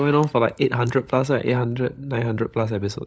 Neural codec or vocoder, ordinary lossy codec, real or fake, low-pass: codec, 16 kHz, 16 kbps, FunCodec, trained on LibriTTS, 50 frames a second; none; fake; none